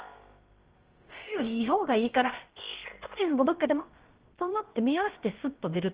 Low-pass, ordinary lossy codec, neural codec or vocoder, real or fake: 3.6 kHz; Opus, 16 kbps; codec, 16 kHz, about 1 kbps, DyCAST, with the encoder's durations; fake